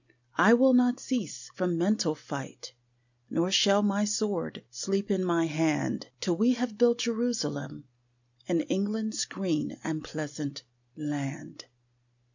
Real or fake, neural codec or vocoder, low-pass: real; none; 7.2 kHz